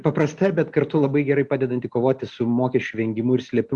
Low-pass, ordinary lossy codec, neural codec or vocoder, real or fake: 10.8 kHz; Opus, 64 kbps; none; real